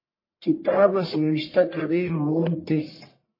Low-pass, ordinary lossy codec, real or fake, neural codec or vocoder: 5.4 kHz; MP3, 24 kbps; fake; codec, 44.1 kHz, 1.7 kbps, Pupu-Codec